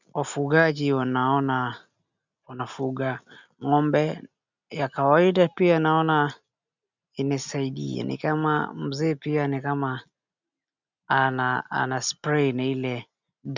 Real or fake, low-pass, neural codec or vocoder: real; 7.2 kHz; none